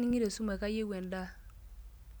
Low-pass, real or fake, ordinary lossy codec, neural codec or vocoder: none; real; none; none